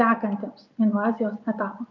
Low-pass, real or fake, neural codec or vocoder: 7.2 kHz; real; none